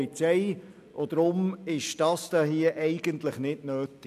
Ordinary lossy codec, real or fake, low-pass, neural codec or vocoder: none; real; 14.4 kHz; none